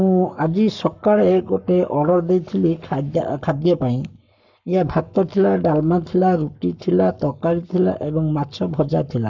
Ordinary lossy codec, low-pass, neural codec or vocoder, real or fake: none; 7.2 kHz; codec, 44.1 kHz, 7.8 kbps, Pupu-Codec; fake